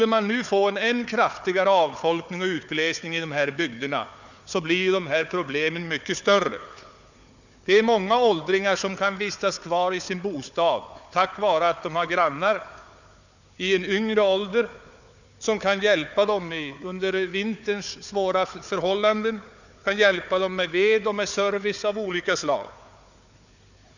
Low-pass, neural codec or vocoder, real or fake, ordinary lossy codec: 7.2 kHz; codec, 16 kHz, 4 kbps, FunCodec, trained on Chinese and English, 50 frames a second; fake; none